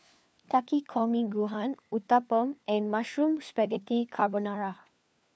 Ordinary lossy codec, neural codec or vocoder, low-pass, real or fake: none; codec, 16 kHz, 4 kbps, FunCodec, trained on LibriTTS, 50 frames a second; none; fake